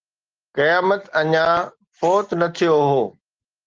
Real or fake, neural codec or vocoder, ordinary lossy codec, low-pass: real; none; Opus, 16 kbps; 7.2 kHz